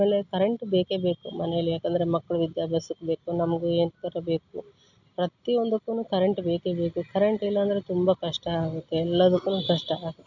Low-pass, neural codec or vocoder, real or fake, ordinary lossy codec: 7.2 kHz; none; real; none